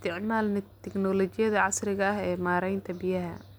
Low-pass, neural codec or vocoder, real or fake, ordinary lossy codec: none; none; real; none